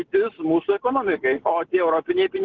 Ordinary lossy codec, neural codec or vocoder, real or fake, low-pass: Opus, 16 kbps; none; real; 7.2 kHz